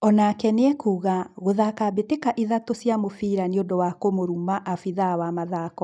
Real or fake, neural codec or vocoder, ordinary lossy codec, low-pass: real; none; none; 9.9 kHz